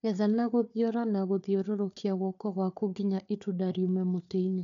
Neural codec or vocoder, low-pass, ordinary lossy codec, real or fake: codec, 16 kHz, 4 kbps, FunCodec, trained on Chinese and English, 50 frames a second; 7.2 kHz; none; fake